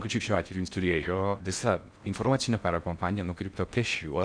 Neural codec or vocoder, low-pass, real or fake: codec, 16 kHz in and 24 kHz out, 0.6 kbps, FocalCodec, streaming, 4096 codes; 9.9 kHz; fake